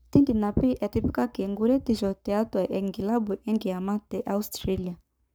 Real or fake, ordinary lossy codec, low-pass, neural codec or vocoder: fake; none; none; codec, 44.1 kHz, 7.8 kbps, Pupu-Codec